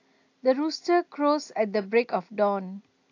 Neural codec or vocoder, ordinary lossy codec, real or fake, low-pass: none; AAC, 48 kbps; real; 7.2 kHz